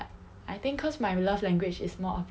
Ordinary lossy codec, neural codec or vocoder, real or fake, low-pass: none; none; real; none